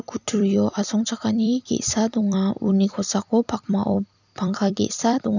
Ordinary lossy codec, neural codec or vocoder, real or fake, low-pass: none; vocoder, 44.1 kHz, 128 mel bands every 256 samples, BigVGAN v2; fake; 7.2 kHz